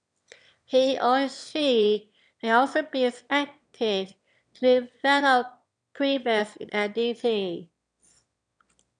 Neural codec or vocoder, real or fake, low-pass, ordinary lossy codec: autoencoder, 22.05 kHz, a latent of 192 numbers a frame, VITS, trained on one speaker; fake; 9.9 kHz; MP3, 96 kbps